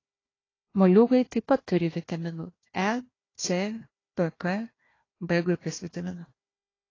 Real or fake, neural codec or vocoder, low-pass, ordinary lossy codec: fake; codec, 16 kHz, 1 kbps, FunCodec, trained on Chinese and English, 50 frames a second; 7.2 kHz; AAC, 32 kbps